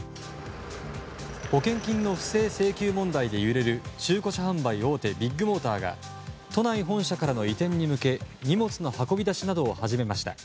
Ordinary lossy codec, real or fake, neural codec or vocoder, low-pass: none; real; none; none